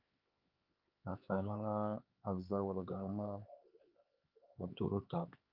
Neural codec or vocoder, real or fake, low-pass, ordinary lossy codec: codec, 16 kHz, 4 kbps, X-Codec, HuBERT features, trained on LibriSpeech; fake; 5.4 kHz; Opus, 32 kbps